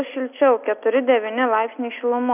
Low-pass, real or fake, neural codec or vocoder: 3.6 kHz; real; none